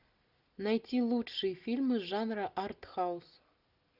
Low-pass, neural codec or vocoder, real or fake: 5.4 kHz; none; real